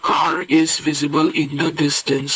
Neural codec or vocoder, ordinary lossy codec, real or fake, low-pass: codec, 16 kHz, 4 kbps, FunCodec, trained on LibriTTS, 50 frames a second; none; fake; none